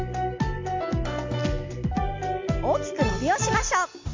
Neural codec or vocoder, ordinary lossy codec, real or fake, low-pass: none; MP3, 48 kbps; real; 7.2 kHz